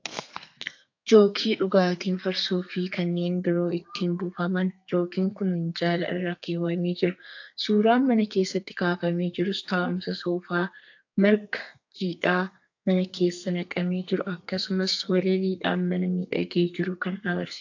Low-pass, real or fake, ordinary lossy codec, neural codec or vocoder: 7.2 kHz; fake; AAC, 48 kbps; codec, 32 kHz, 1.9 kbps, SNAC